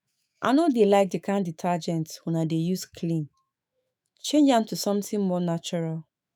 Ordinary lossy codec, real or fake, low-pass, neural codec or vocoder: none; fake; none; autoencoder, 48 kHz, 128 numbers a frame, DAC-VAE, trained on Japanese speech